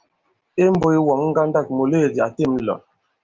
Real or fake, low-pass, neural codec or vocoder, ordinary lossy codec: real; 7.2 kHz; none; Opus, 24 kbps